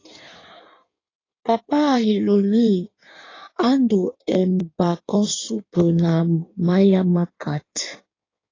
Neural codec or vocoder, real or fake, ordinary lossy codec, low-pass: codec, 16 kHz in and 24 kHz out, 1.1 kbps, FireRedTTS-2 codec; fake; AAC, 32 kbps; 7.2 kHz